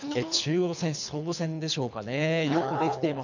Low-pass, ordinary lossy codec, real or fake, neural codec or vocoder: 7.2 kHz; none; fake; codec, 24 kHz, 3 kbps, HILCodec